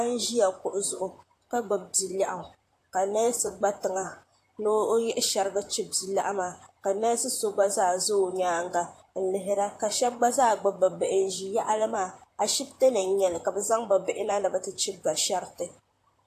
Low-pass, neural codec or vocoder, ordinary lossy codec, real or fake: 14.4 kHz; vocoder, 44.1 kHz, 128 mel bands, Pupu-Vocoder; AAC, 64 kbps; fake